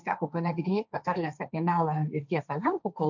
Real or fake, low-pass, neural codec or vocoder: fake; 7.2 kHz; codec, 16 kHz, 1.1 kbps, Voila-Tokenizer